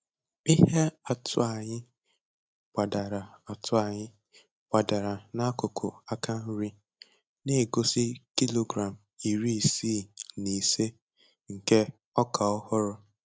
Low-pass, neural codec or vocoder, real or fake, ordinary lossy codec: none; none; real; none